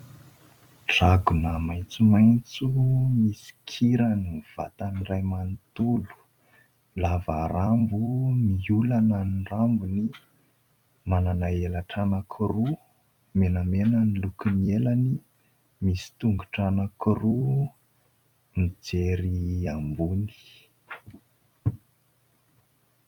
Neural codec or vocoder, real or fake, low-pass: vocoder, 44.1 kHz, 128 mel bands every 256 samples, BigVGAN v2; fake; 19.8 kHz